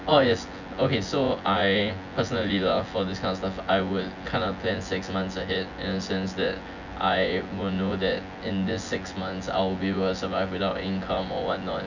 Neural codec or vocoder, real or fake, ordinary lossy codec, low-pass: vocoder, 24 kHz, 100 mel bands, Vocos; fake; none; 7.2 kHz